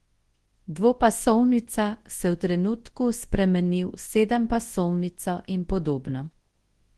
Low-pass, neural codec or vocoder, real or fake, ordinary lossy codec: 10.8 kHz; codec, 24 kHz, 0.9 kbps, WavTokenizer, large speech release; fake; Opus, 16 kbps